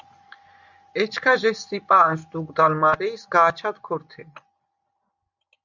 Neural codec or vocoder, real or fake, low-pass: none; real; 7.2 kHz